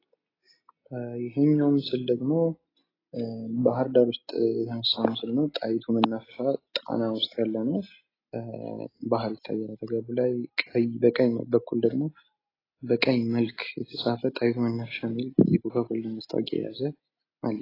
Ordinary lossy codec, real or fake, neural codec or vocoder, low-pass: AAC, 24 kbps; real; none; 5.4 kHz